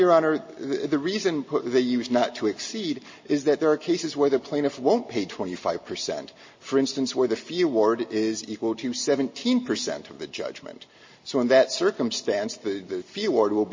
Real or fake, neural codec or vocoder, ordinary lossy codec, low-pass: real; none; MP3, 32 kbps; 7.2 kHz